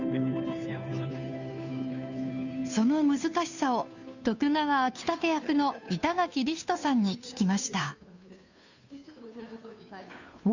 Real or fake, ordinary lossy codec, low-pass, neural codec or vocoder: fake; none; 7.2 kHz; codec, 16 kHz, 2 kbps, FunCodec, trained on Chinese and English, 25 frames a second